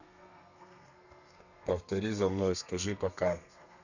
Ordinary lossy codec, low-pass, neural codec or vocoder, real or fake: none; 7.2 kHz; codec, 32 kHz, 1.9 kbps, SNAC; fake